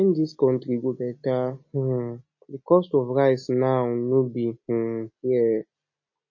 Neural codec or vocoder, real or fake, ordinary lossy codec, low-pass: none; real; MP3, 32 kbps; 7.2 kHz